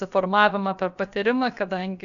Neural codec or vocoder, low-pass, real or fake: codec, 16 kHz, about 1 kbps, DyCAST, with the encoder's durations; 7.2 kHz; fake